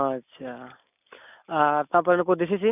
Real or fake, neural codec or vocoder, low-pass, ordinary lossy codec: real; none; 3.6 kHz; none